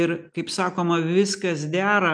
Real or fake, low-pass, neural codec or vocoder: real; 9.9 kHz; none